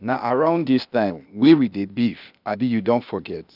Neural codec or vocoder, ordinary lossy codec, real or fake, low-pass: codec, 16 kHz, 0.8 kbps, ZipCodec; none; fake; 5.4 kHz